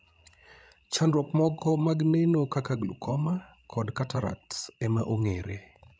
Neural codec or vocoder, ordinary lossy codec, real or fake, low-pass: codec, 16 kHz, 16 kbps, FreqCodec, larger model; none; fake; none